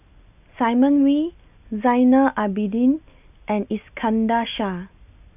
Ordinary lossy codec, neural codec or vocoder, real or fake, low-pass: none; none; real; 3.6 kHz